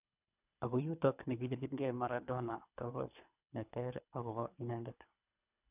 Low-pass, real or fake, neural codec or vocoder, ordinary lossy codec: 3.6 kHz; fake; codec, 24 kHz, 3 kbps, HILCodec; none